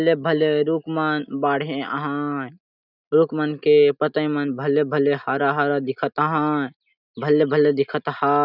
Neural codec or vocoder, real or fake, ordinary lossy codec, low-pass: none; real; none; 5.4 kHz